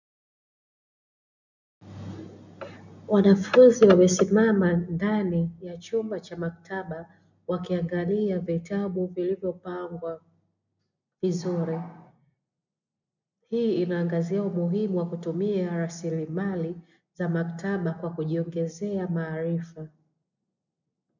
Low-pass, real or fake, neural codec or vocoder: 7.2 kHz; real; none